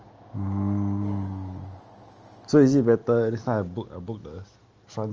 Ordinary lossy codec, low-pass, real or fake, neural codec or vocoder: Opus, 24 kbps; 7.2 kHz; real; none